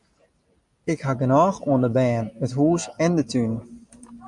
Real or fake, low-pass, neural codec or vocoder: real; 10.8 kHz; none